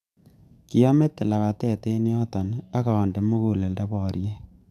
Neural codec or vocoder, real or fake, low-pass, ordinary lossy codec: codec, 44.1 kHz, 7.8 kbps, DAC; fake; 14.4 kHz; none